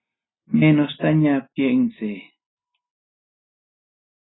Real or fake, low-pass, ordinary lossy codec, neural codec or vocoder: real; 7.2 kHz; AAC, 16 kbps; none